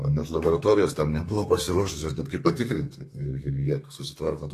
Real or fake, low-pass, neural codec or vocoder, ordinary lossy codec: fake; 14.4 kHz; codec, 32 kHz, 1.9 kbps, SNAC; AAC, 48 kbps